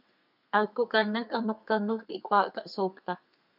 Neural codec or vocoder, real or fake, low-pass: codec, 32 kHz, 1.9 kbps, SNAC; fake; 5.4 kHz